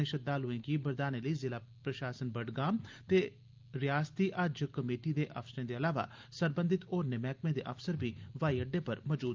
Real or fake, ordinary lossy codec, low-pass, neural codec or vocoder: real; Opus, 32 kbps; 7.2 kHz; none